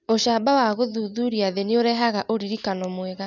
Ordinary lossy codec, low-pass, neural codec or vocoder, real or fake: none; 7.2 kHz; none; real